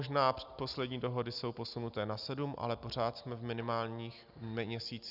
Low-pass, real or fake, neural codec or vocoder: 5.4 kHz; real; none